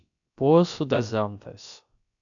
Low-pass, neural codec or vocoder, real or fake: 7.2 kHz; codec, 16 kHz, about 1 kbps, DyCAST, with the encoder's durations; fake